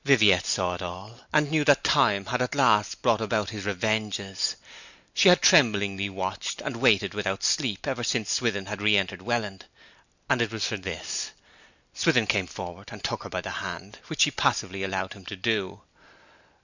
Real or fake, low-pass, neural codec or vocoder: real; 7.2 kHz; none